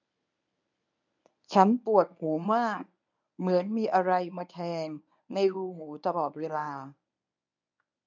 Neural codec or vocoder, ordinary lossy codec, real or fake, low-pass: codec, 24 kHz, 0.9 kbps, WavTokenizer, medium speech release version 1; none; fake; 7.2 kHz